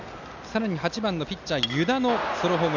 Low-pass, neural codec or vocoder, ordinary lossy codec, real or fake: 7.2 kHz; none; none; real